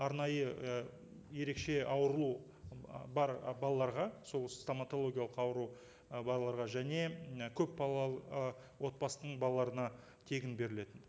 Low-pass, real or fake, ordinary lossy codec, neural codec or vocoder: none; real; none; none